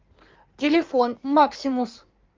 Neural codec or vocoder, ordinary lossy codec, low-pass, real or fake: codec, 16 kHz in and 24 kHz out, 1.1 kbps, FireRedTTS-2 codec; Opus, 32 kbps; 7.2 kHz; fake